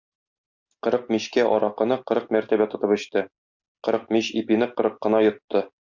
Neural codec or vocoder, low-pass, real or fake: none; 7.2 kHz; real